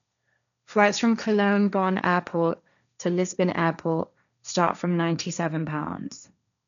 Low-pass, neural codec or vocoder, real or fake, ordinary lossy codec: 7.2 kHz; codec, 16 kHz, 1.1 kbps, Voila-Tokenizer; fake; none